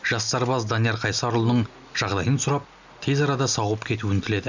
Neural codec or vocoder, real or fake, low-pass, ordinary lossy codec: none; real; 7.2 kHz; none